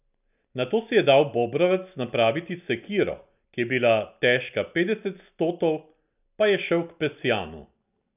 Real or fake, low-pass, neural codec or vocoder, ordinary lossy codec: real; 3.6 kHz; none; none